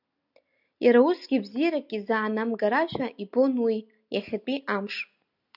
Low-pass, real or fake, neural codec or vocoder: 5.4 kHz; real; none